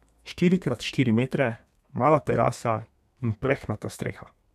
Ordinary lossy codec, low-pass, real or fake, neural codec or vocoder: none; 14.4 kHz; fake; codec, 32 kHz, 1.9 kbps, SNAC